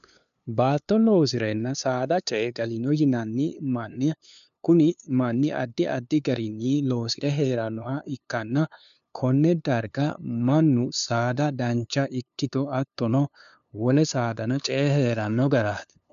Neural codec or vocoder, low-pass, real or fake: codec, 16 kHz, 2 kbps, FunCodec, trained on LibriTTS, 25 frames a second; 7.2 kHz; fake